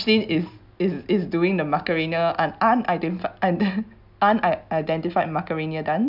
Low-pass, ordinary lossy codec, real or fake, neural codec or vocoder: 5.4 kHz; none; real; none